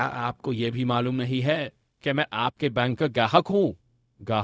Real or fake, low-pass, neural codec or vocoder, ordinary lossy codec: fake; none; codec, 16 kHz, 0.4 kbps, LongCat-Audio-Codec; none